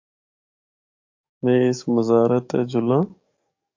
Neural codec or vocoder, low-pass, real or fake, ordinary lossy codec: codec, 44.1 kHz, 7.8 kbps, DAC; 7.2 kHz; fake; AAC, 48 kbps